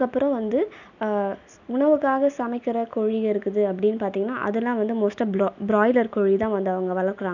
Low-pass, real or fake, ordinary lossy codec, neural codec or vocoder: 7.2 kHz; real; none; none